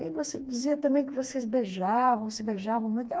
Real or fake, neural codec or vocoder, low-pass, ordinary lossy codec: fake; codec, 16 kHz, 2 kbps, FreqCodec, smaller model; none; none